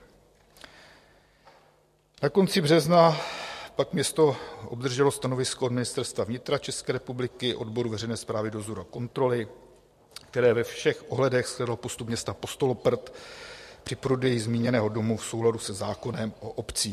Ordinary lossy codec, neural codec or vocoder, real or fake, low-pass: MP3, 64 kbps; none; real; 14.4 kHz